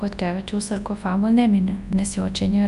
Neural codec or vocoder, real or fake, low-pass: codec, 24 kHz, 0.9 kbps, WavTokenizer, large speech release; fake; 10.8 kHz